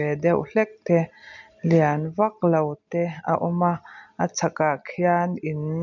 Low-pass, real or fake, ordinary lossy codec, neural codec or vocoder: 7.2 kHz; real; none; none